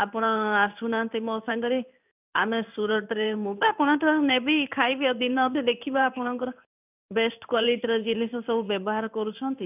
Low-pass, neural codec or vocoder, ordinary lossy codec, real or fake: 3.6 kHz; codec, 16 kHz in and 24 kHz out, 1 kbps, XY-Tokenizer; none; fake